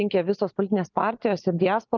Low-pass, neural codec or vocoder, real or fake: 7.2 kHz; none; real